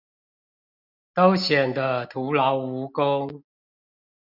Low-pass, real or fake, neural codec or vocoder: 5.4 kHz; real; none